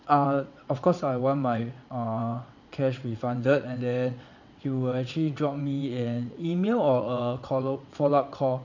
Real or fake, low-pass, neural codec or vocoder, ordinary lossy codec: fake; 7.2 kHz; vocoder, 22.05 kHz, 80 mel bands, WaveNeXt; none